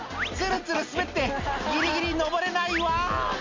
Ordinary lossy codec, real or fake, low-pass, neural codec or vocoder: MP3, 48 kbps; real; 7.2 kHz; none